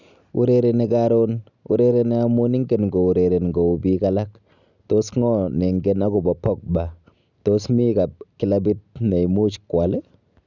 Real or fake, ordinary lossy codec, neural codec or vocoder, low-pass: real; none; none; 7.2 kHz